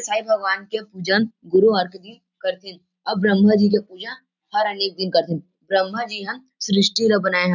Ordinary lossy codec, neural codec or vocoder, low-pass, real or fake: none; none; 7.2 kHz; real